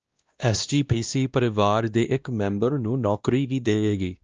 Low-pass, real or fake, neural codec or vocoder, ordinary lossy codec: 7.2 kHz; fake; codec, 16 kHz, 1 kbps, X-Codec, WavLM features, trained on Multilingual LibriSpeech; Opus, 24 kbps